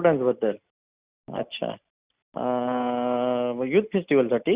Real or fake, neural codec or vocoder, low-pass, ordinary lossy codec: real; none; 3.6 kHz; Opus, 64 kbps